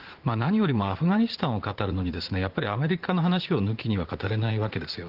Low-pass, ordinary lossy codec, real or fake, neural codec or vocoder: 5.4 kHz; Opus, 16 kbps; fake; vocoder, 44.1 kHz, 80 mel bands, Vocos